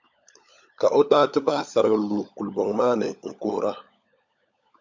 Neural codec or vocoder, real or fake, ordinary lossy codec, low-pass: codec, 16 kHz, 16 kbps, FunCodec, trained on LibriTTS, 50 frames a second; fake; MP3, 64 kbps; 7.2 kHz